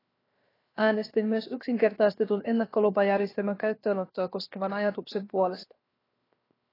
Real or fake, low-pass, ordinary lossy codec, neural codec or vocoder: fake; 5.4 kHz; AAC, 24 kbps; codec, 16 kHz, 0.7 kbps, FocalCodec